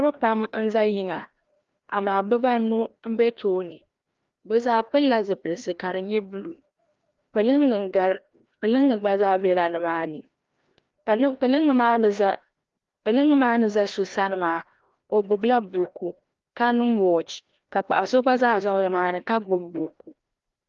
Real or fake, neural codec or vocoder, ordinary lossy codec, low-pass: fake; codec, 16 kHz, 1 kbps, FreqCodec, larger model; Opus, 32 kbps; 7.2 kHz